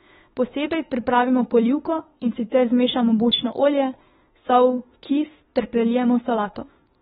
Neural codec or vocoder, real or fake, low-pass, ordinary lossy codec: autoencoder, 48 kHz, 32 numbers a frame, DAC-VAE, trained on Japanese speech; fake; 19.8 kHz; AAC, 16 kbps